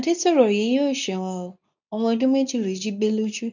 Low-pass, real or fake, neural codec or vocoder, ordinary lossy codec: 7.2 kHz; fake; codec, 24 kHz, 0.9 kbps, WavTokenizer, medium speech release version 2; none